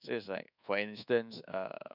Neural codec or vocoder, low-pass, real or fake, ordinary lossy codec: codec, 24 kHz, 1.2 kbps, DualCodec; 5.4 kHz; fake; none